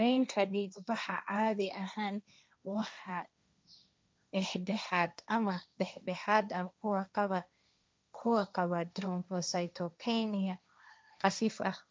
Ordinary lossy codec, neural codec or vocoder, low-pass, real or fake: none; codec, 16 kHz, 1.1 kbps, Voila-Tokenizer; none; fake